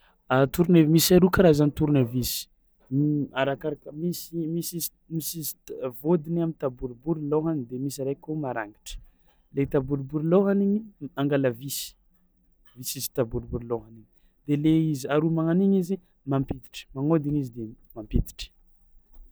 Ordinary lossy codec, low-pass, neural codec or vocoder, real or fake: none; none; none; real